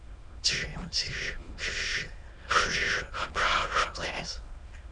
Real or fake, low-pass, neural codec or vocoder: fake; 9.9 kHz; autoencoder, 22.05 kHz, a latent of 192 numbers a frame, VITS, trained on many speakers